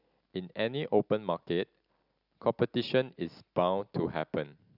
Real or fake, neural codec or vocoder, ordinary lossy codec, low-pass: real; none; none; 5.4 kHz